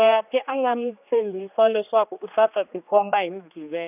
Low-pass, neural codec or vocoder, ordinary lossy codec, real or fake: 3.6 kHz; codec, 16 kHz, 2 kbps, X-Codec, HuBERT features, trained on general audio; none; fake